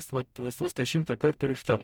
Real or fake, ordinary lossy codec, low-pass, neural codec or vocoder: fake; MP3, 96 kbps; 19.8 kHz; codec, 44.1 kHz, 0.9 kbps, DAC